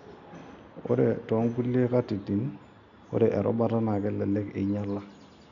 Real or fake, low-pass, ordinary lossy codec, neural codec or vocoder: real; 7.2 kHz; none; none